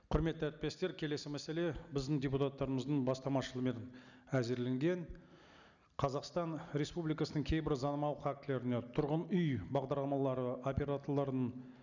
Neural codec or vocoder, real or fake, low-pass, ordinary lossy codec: none; real; 7.2 kHz; none